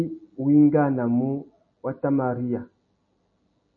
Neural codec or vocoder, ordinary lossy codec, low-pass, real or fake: none; MP3, 32 kbps; 7.2 kHz; real